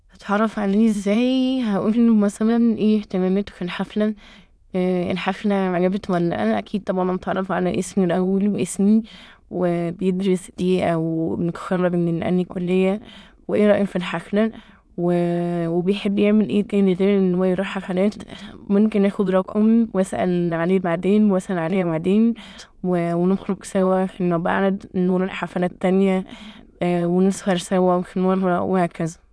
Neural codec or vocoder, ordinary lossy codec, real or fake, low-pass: autoencoder, 22.05 kHz, a latent of 192 numbers a frame, VITS, trained on many speakers; none; fake; none